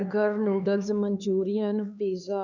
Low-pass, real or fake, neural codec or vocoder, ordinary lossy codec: 7.2 kHz; fake; codec, 16 kHz, 4 kbps, X-Codec, HuBERT features, trained on LibriSpeech; none